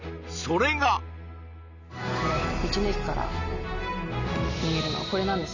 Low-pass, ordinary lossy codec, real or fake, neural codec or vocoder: 7.2 kHz; none; real; none